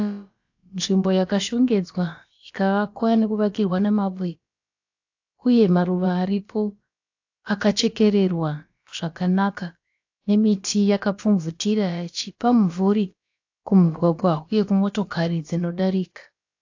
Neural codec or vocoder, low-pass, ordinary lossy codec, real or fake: codec, 16 kHz, about 1 kbps, DyCAST, with the encoder's durations; 7.2 kHz; AAC, 48 kbps; fake